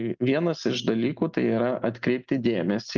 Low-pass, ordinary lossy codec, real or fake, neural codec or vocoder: 7.2 kHz; Opus, 24 kbps; real; none